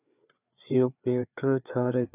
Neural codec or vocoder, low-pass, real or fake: codec, 16 kHz, 4 kbps, FreqCodec, larger model; 3.6 kHz; fake